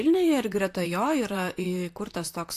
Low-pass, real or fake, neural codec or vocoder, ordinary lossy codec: 14.4 kHz; fake; vocoder, 44.1 kHz, 128 mel bands, Pupu-Vocoder; AAC, 64 kbps